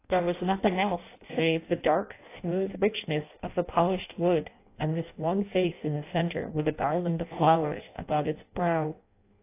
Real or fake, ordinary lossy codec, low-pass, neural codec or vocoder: fake; AAC, 24 kbps; 3.6 kHz; codec, 16 kHz in and 24 kHz out, 0.6 kbps, FireRedTTS-2 codec